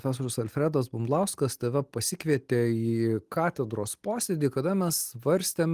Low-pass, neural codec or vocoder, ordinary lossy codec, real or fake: 14.4 kHz; none; Opus, 24 kbps; real